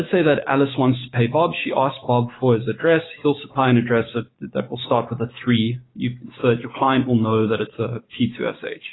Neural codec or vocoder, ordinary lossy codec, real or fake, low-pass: codec, 16 kHz, 6 kbps, DAC; AAC, 16 kbps; fake; 7.2 kHz